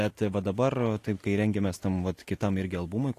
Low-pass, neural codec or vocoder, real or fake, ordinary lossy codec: 14.4 kHz; none; real; AAC, 48 kbps